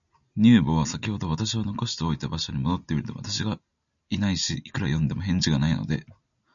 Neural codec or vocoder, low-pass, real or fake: none; 7.2 kHz; real